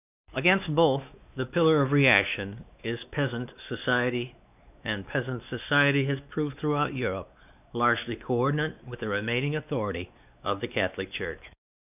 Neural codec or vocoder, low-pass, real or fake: codec, 16 kHz, 2 kbps, X-Codec, WavLM features, trained on Multilingual LibriSpeech; 3.6 kHz; fake